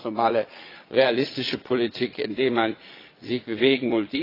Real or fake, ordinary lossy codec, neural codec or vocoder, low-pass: fake; AAC, 24 kbps; vocoder, 22.05 kHz, 80 mel bands, Vocos; 5.4 kHz